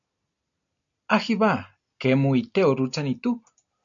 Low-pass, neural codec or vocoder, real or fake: 7.2 kHz; none; real